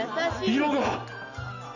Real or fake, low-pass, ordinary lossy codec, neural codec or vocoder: real; 7.2 kHz; none; none